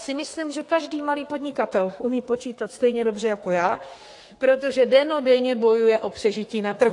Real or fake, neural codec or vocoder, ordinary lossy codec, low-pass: fake; codec, 32 kHz, 1.9 kbps, SNAC; AAC, 48 kbps; 10.8 kHz